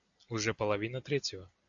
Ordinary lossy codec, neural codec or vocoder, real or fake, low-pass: AAC, 64 kbps; none; real; 7.2 kHz